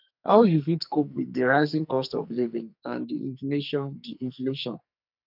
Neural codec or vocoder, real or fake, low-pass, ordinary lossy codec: codec, 44.1 kHz, 2.6 kbps, SNAC; fake; 5.4 kHz; none